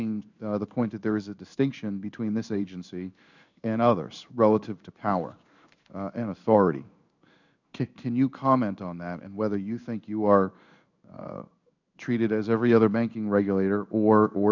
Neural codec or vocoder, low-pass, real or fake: codec, 16 kHz in and 24 kHz out, 1 kbps, XY-Tokenizer; 7.2 kHz; fake